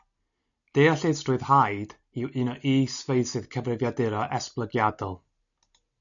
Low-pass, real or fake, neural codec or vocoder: 7.2 kHz; real; none